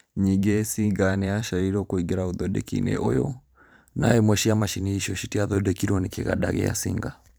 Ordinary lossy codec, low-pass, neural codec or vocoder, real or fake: none; none; vocoder, 44.1 kHz, 128 mel bands every 512 samples, BigVGAN v2; fake